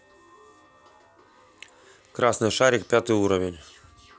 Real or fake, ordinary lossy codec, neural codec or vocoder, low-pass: real; none; none; none